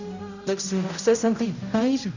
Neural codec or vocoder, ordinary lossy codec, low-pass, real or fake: codec, 16 kHz, 0.5 kbps, X-Codec, HuBERT features, trained on general audio; none; 7.2 kHz; fake